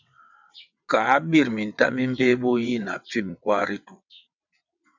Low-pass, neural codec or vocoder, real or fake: 7.2 kHz; vocoder, 22.05 kHz, 80 mel bands, WaveNeXt; fake